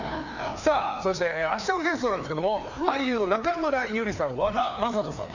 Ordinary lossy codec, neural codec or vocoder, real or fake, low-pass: none; codec, 16 kHz, 2 kbps, FreqCodec, larger model; fake; 7.2 kHz